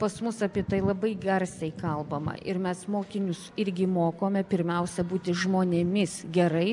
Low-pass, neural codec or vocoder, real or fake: 10.8 kHz; none; real